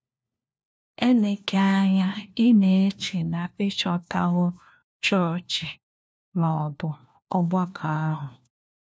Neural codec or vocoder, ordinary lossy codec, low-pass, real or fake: codec, 16 kHz, 1 kbps, FunCodec, trained on LibriTTS, 50 frames a second; none; none; fake